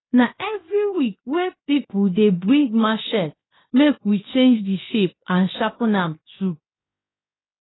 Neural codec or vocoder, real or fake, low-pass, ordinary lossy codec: codec, 16 kHz, 0.7 kbps, FocalCodec; fake; 7.2 kHz; AAC, 16 kbps